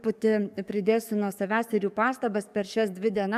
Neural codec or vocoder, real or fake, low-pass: codec, 44.1 kHz, 7.8 kbps, DAC; fake; 14.4 kHz